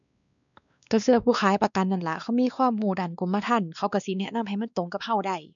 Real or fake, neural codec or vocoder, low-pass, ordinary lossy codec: fake; codec, 16 kHz, 2 kbps, X-Codec, WavLM features, trained on Multilingual LibriSpeech; 7.2 kHz; none